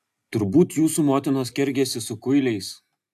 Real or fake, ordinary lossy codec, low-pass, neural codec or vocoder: real; AAC, 96 kbps; 14.4 kHz; none